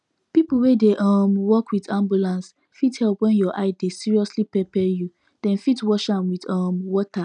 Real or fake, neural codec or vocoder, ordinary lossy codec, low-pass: real; none; none; 10.8 kHz